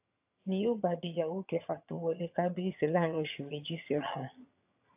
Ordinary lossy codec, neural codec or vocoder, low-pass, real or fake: AAC, 32 kbps; vocoder, 22.05 kHz, 80 mel bands, HiFi-GAN; 3.6 kHz; fake